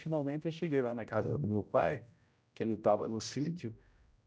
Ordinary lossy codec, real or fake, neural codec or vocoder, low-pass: none; fake; codec, 16 kHz, 0.5 kbps, X-Codec, HuBERT features, trained on general audio; none